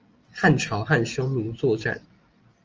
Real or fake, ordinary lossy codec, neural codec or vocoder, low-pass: real; Opus, 24 kbps; none; 7.2 kHz